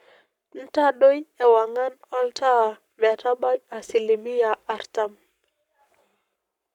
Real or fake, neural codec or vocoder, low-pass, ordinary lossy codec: fake; codec, 44.1 kHz, 7.8 kbps, Pupu-Codec; 19.8 kHz; none